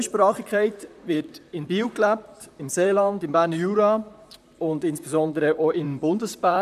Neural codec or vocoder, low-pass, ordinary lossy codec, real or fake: vocoder, 44.1 kHz, 128 mel bands, Pupu-Vocoder; 14.4 kHz; none; fake